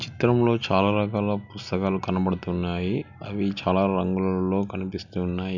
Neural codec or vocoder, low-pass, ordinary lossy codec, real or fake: none; 7.2 kHz; none; real